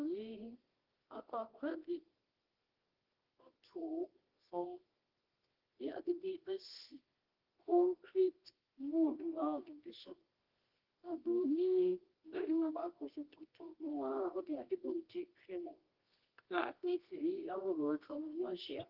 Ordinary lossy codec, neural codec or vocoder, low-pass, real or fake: Opus, 16 kbps; codec, 24 kHz, 0.9 kbps, WavTokenizer, medium music audio release; 5.4 kHz; fake